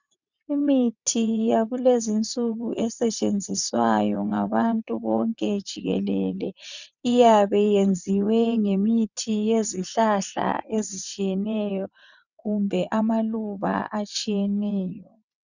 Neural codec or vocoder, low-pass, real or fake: vocoder, 22.05 kHz, 80 mel bands, WaveNeXt; 7.2 kHz; fake